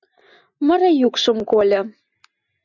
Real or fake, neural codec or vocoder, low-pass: real; none; 7.2 kHz